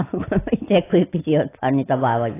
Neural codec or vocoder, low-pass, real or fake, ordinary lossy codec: none; 3.6 kHz; real; AAC, 16 kbps